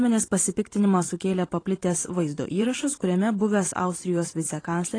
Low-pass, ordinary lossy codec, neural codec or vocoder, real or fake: 9.9 kHz; AAC, 32 kbps; vocoder, 44.1 kHz, 128 mel bands every 512 samples, BigVGAN v2; fake